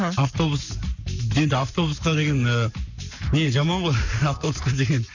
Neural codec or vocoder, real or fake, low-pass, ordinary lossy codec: codec, 44.1 kHz, 7.8 kbps, Pupu-Codec; fake; 7.2 kHz; none